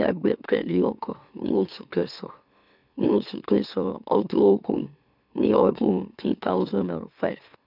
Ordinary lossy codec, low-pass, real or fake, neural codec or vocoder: none; 5.4 kHz; fake; autoencoder, 44.1 kHz, a latent of 192 numbers a frame, MeloTTS